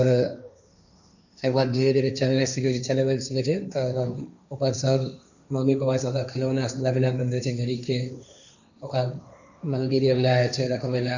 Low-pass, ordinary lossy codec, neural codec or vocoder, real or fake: 7.2 kHz; none; codec, 16 kHz, 1.1 kbps, Voila-Tokenizer; fake